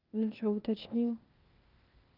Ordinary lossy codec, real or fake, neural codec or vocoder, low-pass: Opus, 24 kbps; fake; codec, 16 kHz, 0.8 kbps, ZipCodec; 5.4 kHz